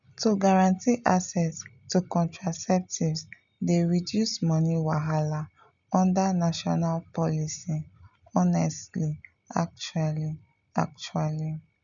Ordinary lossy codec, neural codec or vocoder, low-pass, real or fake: none; none; 7.2 kHz; real